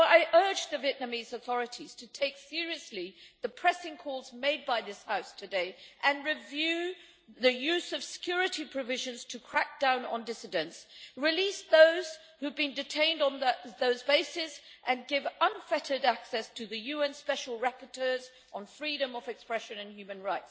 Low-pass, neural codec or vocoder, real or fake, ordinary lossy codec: none; none; real; none